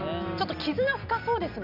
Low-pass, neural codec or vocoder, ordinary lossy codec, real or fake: 5.4 kHz; none; none; real